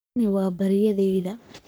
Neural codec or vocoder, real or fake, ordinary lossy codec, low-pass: codec, 44.1 kHz, 3.4 kbps, Pupu-Codec; fake; none; none